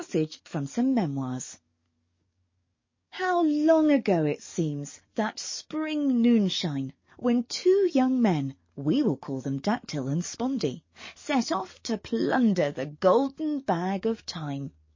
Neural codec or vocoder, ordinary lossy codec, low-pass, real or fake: codec, 44.1 kHz, 7.8 kbps, DAC; MP3, 32 kbps; 7.2 kHz; fake